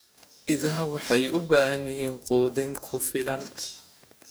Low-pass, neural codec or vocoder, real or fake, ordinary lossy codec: none; codec, 44.1 kHz, 2.6 kbps, DAC; fake; none